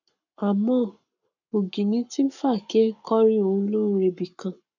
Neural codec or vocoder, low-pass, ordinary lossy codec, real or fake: codec, 44.1 kHz, 7.8 kbps, Pupu-Codec; 7.2 kHz; none; fake